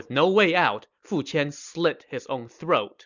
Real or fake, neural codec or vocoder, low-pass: real; none; 7.2 kHz